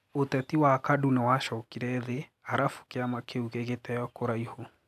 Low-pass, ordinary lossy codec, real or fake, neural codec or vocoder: 14.4 kHz; none; real; none